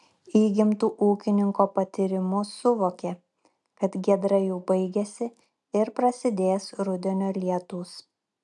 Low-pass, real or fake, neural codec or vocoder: 10.8 kHz; real; none